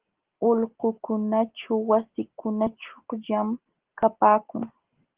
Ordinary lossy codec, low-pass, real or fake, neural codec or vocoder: Opus, 16 kbps; 3.6 kHz; real; none